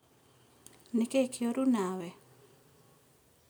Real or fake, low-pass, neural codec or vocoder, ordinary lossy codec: real; none; none; none